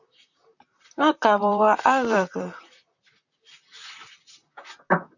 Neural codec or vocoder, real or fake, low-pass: vocoder, 44.1 kHz, 128 mel bands, Pupu-Vocoder; fake; 7.2 kHz